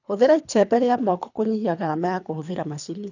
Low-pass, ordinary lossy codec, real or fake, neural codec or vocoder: 7.2 kHz; none; fake; codec, 24 kHz, 3 kbps, HILCodec